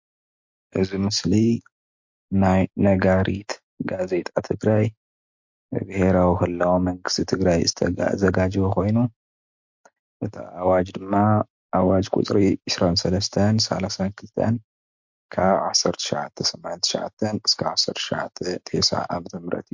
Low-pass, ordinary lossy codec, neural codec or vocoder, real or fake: 7.2 kHz; MP3, 48 kbps; none; real